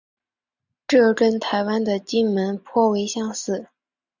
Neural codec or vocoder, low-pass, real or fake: none; 7.2 kHz; real